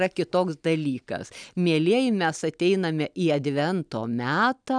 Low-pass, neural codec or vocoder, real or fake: 9.9 kHz; none; real